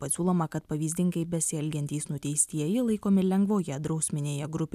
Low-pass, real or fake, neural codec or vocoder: 14.4 kHz; real; none